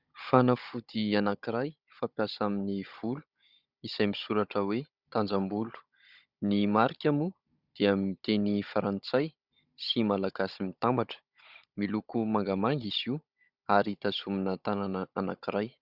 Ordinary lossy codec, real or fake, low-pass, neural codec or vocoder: Opus, 64 kbps; fake; 5.4 kHz; codec, 16 kHz, 16 kbps, FunCodec, trained on Chinese and English, 50 frames a second